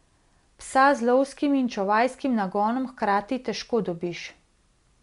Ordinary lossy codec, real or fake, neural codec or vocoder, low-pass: MP3, 64 kbps; real; none; 10.8 kHz